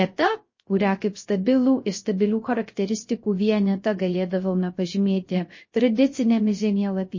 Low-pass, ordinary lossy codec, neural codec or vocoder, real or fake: 7.2 kHz; MP3, 32 kbps; codec, 16 kHz, 0.3 kbps, FocalCodec; fake